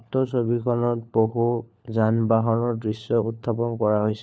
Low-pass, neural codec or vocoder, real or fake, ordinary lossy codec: none; codec, 16 kHz, 4 kbps, FunCodec, trained on LibriTTS, 50 frames a second; fake; none